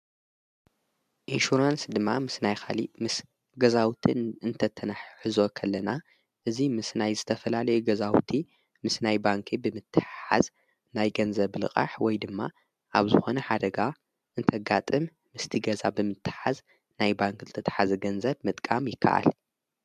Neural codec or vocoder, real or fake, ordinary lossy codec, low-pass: none; real; MP3, 96 kbps; 14.4 kHz